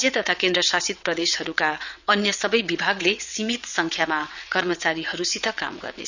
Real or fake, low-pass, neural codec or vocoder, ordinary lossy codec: fake; 7.2 kHz; vocoder, 22.05 kHz, 80 mel bands, WaveNeXt; none